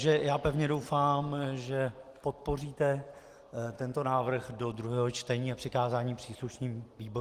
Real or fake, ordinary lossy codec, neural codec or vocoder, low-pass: real; Opus, 24 kbps; none; 14.4 kHz